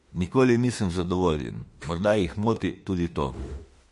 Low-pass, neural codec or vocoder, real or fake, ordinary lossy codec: 14.4 kHz; autoencoder, 48 kHz, 32 numbers a frame, DAC-VAE, trained on Japanese speech; fake; MP3, 48 kbps